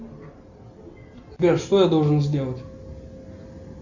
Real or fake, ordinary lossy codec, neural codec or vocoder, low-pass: real; Opus, 64 kbps; none; 7.2 kHz